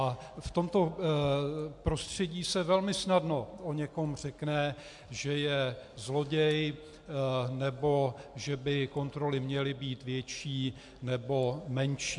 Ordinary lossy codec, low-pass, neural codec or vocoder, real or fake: MP3, 64 kbps; 10.8 kHz; none; real